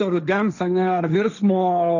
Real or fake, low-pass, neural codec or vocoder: fake; 7.2 kHz; codec, 16 kHz, 1.1 kbps, Voila-Tokenizer